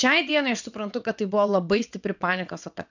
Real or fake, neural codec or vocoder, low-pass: fake; vocoder, 22.05 kHz, 80 mel bands, WaveNeXt; 7.2 kHz